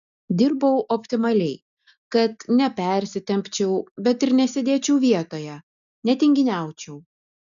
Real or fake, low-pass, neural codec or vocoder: real; 7.2 kHz; none